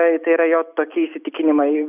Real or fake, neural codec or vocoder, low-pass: real; none; 3.6 kHz